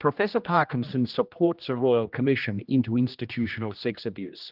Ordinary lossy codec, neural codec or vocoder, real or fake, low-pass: Opus, 24 kbps; codec, 16 kHz, 1 kbps, X-Codec, HuBERT features, trained on general audio; fake; 5.4 kHz